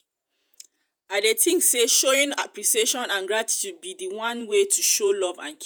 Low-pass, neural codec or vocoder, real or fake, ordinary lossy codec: none; vocoder, 48 kHz, 128 mel bands, Vocos; fake; none